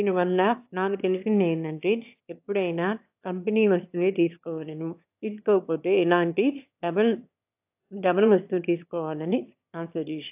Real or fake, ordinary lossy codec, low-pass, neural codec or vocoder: fake; AAC, 32 kbps; 3.6 kHz; autoencoder, 22.05 kHz, a latent of 192 numbers a frame, VITS, trained on one speaker